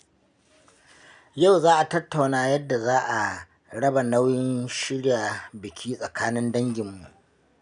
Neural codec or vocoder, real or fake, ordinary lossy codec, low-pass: none; real; none; 9.9 kHz